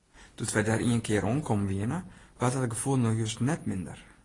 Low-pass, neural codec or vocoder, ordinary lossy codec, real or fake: 10.8 kHz; none; AAC, 32 kbps; real